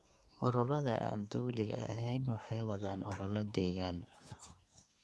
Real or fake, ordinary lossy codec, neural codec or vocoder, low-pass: fake; none; codec, 24 kHz, 1 kbps, SNAC; none